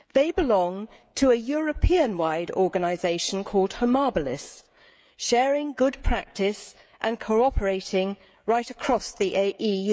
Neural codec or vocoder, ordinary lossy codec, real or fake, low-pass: codec, 16 kHz, 16 kbps, FreqCodec, smaller model; none; fake; none